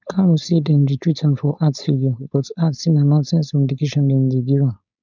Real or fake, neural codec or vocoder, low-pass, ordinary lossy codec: fake; codec, 16 kHz, 4.8 kbps, FACodec; 7.2 kHz; none